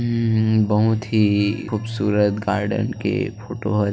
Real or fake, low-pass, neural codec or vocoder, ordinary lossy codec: real; none; none; none